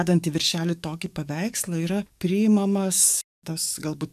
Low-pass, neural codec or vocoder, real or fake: 14.4 kHz; codec, 44.1 kHz, 7.8 kbps, DAC; fake